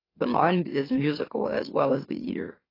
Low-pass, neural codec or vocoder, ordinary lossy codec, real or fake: 5.4 kHz; autoencoder, 44.1 kHz, a latent of 192 numbers a frame, MeloTTS; AAC, 24 kbps; fake